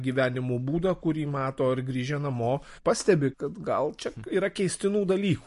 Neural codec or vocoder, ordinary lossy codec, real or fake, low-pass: none; MP3, 48 kbps; real; 14.4 kHz